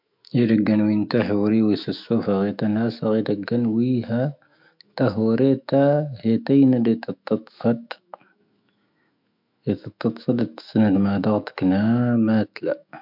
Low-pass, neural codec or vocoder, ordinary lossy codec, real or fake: 5.4 kHz; autoencoder, 48 kHz, 128 numbers a frame, DAC-VAE, trained on Japanese speech; MP3, 48 kbps; fake